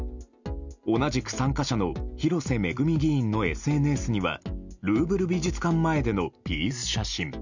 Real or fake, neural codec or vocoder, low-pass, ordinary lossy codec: real; none; 7.2 kHz; none